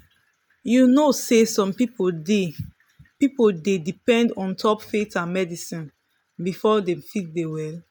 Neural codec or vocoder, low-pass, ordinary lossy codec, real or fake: none; none; none; real